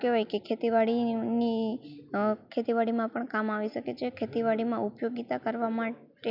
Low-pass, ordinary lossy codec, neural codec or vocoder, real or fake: 5.4 kHz; none; none; real